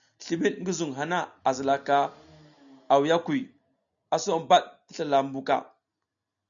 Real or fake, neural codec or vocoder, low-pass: real; none; 7.2 kHz